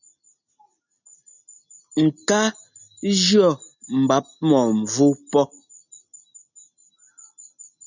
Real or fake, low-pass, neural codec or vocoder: real; 7.2 kHz; none